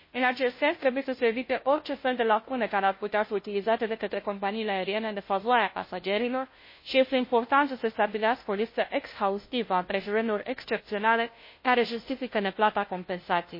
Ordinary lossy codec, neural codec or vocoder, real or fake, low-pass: MP3, 24 kbps; codec, 16 kHz, 0.5 kbps, FunCodec, trained on Chinese and English, 25 frames a second; fake; 5.4 kHz